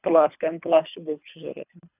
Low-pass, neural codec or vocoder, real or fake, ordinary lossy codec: 3.6 kHz; codec, 24 kHz, 3 kbps, HILCodec; fake; none